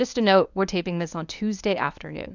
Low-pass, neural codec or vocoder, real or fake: 7.2 kHz; codec, 24 kHz, 0.9 kbps, WavTokenizer, medium speech release version 1; fake